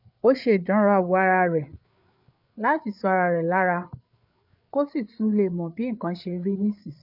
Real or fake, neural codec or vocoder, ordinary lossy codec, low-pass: fake; codec, 16 kHz, 8 kbps, FreqCodec, larger model; none; 5.4 kHz